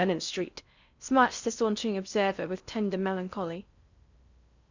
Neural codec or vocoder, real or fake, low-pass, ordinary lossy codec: codec, 16 kHz in and 24 kHz out, 0.6 kbps, FocalCodec, streaming, 4096 codes; fake; 7.2 kHz; Opus, 64 kbps